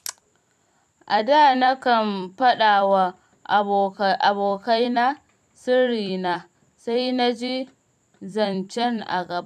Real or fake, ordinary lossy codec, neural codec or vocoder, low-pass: fake; none; vocoder, 44.1 kHz, 128 mel bands every 256 samples, BigVGAN v2; 14.4 kHz